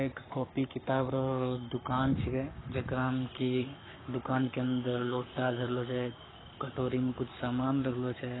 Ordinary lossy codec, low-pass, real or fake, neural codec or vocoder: AAC, 16 kbps; 7.2 kHz; fake; codec, 44.1 kHz, 7.8 kbps, Pupu-Codec